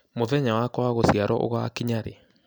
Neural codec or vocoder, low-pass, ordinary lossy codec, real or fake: none; none; none; real